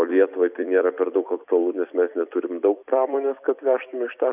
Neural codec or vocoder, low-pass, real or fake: vocoder, 44.1 kHz, 128 mel bands every 256 samples, BigVGAN v2; 3.6 kHz; fake